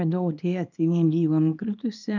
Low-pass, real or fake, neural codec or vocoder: 7.2 kHz; fake; codec, 24 kHz, 0.9 kbps, WavTokenizer, small release